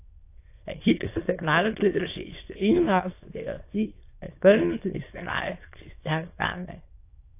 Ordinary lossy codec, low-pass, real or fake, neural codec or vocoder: AAC, 24 kbps; 3.6 kHz; fake; autoencoder, 22.05 kHz, a latent of 192 numbers a frame, VITS, trained on many speakers